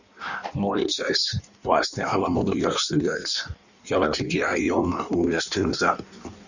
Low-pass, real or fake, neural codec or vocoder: 7.2 kHz; fake; codec, 16 kHz in and 24 kHz out, 1.1 kbps, FireRedTTS-2 codec